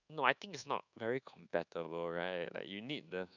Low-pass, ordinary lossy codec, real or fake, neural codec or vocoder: 7.2 kHz; none; fake; codec, 24 kHz, 1.2 kbps, DualCodec